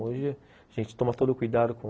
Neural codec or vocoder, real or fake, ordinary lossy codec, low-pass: none; real; none; none